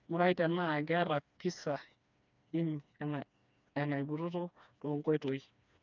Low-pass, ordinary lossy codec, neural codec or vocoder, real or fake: 7.2 kHz; none; codec, 16 kHz, 2 kbps, FreqCodec, smaller model; fake